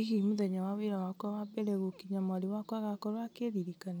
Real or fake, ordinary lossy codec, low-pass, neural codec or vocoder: real; none; none; none